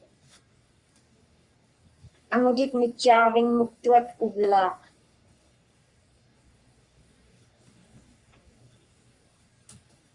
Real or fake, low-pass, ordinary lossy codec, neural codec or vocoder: fake; 10.8 kHz; Opus, 64 kbps; codec, 44.1 kHz, 3.4 kbps, Pupu-Codec